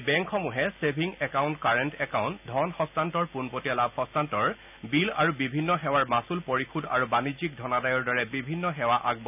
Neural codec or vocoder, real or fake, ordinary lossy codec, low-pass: none; real; none; 3.6 kHz